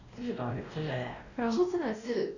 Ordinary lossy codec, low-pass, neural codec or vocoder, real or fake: none; 7.2 kHz; codec, 16 kHz, 1 kbps, X-Codec, WavLM features, trained on Multilingual LibriSpeech; fake